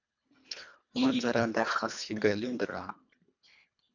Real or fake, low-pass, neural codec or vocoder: fake; 7.2 kHz; codec, 24 kHz, 1.5 kbps, HILCodec